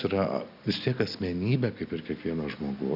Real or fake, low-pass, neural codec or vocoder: real; 5.4 kHz; none